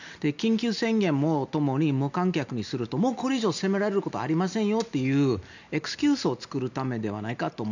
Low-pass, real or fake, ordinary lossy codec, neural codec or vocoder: 7.2 kHz; real; none; none